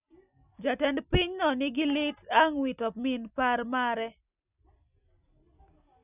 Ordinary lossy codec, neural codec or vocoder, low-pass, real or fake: none; none; 3.6 kHz; real